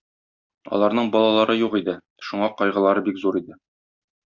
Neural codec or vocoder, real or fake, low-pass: none; real; 7.2 kHz